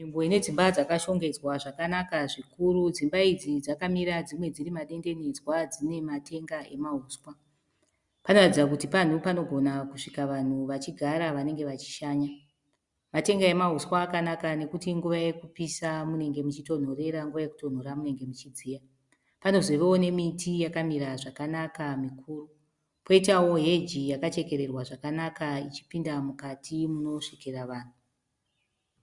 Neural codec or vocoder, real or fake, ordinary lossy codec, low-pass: none; real; Opus, 64 kbps; 10.8 kHz